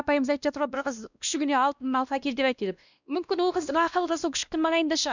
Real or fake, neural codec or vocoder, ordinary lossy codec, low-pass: fake; codec, 16 kHz, 1 kbps, X-Codec, WavLM features, trained on Multilingual LibriSpeech; none; 7.2 kHz